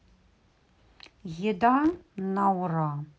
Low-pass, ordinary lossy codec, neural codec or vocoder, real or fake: none; none; none; real